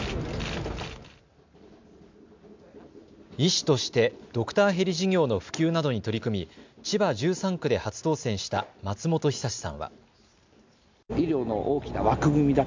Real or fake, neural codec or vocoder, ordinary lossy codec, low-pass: real; none; MP3, 64 kbps; 7.2 kHz